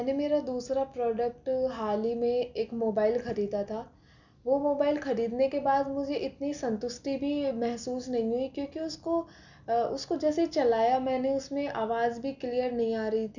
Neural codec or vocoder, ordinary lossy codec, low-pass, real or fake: none; none; 7.2 kHz; real